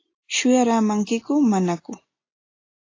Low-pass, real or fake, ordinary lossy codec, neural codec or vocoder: 7.2 kHz; real; AAC, 32 kbps; none